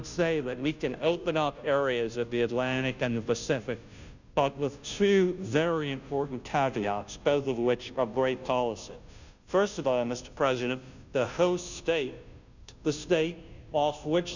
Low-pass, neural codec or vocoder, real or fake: 7.2 kHz; codec, 16 kHz, 0.5 kbps, FunCodec, trained on Chinese and English, 25 frames a second; fake